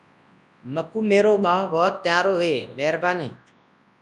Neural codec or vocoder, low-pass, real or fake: codec, 24 kHz, 0.9 kbps, WavTokenizer, large speech release; 10.8 kHz; fake